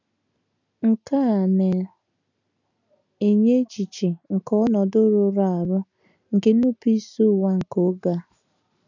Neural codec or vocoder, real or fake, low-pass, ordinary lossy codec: none; real; 7.2 kHz; none